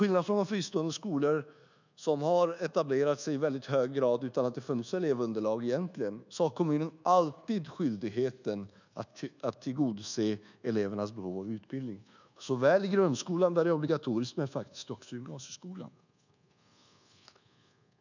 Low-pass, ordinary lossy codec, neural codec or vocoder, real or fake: 7.2 kHz; none; codec, 24 kHz, 1.2 kbps, DualCodec; fake